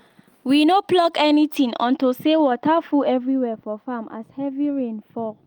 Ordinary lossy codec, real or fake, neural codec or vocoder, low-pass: Opus, 32 kbps; real; none; 19.8 kHz